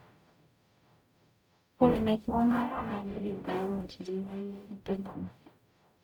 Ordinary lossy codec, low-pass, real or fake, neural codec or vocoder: none; 19.8 kHz; fake; codec, 44.1 kHz, 0.9 kbps, DAC